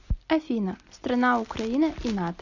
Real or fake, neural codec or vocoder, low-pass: real; none; 7.2 kHz